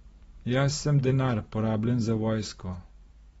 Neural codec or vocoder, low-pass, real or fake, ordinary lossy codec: vocoder, 44.1 kHz, 128 mel bands every 512 samples, BigVGAN v2; 19.8 kHz; fake; AAC, 24 kbps